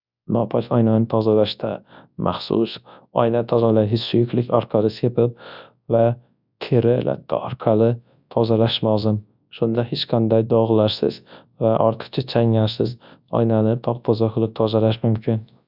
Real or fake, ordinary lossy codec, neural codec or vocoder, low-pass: fake; none; codec, 24 kHz, 0.9 kbps, WavTokenizer, large speech release; 5.4 kHz